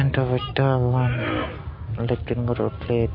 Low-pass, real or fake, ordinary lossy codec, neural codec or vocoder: 5.4 kHz; real; MP3, 32 kbps; none